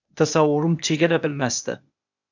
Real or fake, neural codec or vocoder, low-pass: fake; codec, 16 kHz, 0.8 kbps, ZipCodec; 7.2 kHz